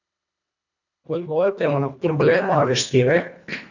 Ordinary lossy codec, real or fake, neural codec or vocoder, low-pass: none; fake; codec, 24 kHz, 1.5 kbps, HILCodec; 7.2 kHz